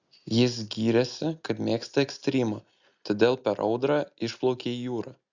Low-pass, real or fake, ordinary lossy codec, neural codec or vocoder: 7.2 kHz; real; Opus, 64 kbps; none